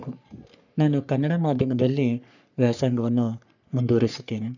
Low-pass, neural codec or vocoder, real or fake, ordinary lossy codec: 7.2 kHz; codec, 44.1 kHz, 3.4 kbps, Pupu-Codec; fake; none